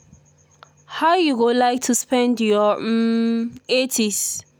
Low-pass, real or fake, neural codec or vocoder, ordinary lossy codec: none; real; none; none